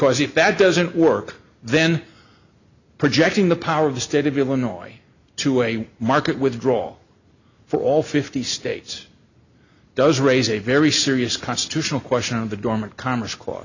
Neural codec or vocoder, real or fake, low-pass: none; real; 7.2 kHz